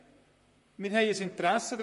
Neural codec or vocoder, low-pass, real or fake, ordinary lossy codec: vocoder, 44.1 kHz, 128 mel bands, Pupu-Vocoder; 14.4 kHz; fake; MP3, 48 kbps